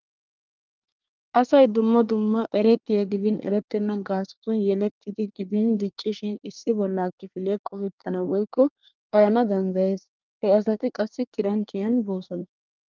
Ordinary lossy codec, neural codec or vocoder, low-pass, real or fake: Opus, 24 kbps; codec, 24 kHz, 1 kbps, SNAC; 7.2 kHz; fake